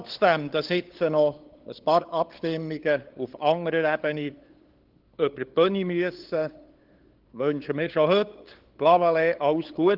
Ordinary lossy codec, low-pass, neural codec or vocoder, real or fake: Opus, 16 kbps; 5.4 kHz; codec, 16 kHz, 8 kbps, FunCodec, trained on LibriTTS, 25 frames a second; fake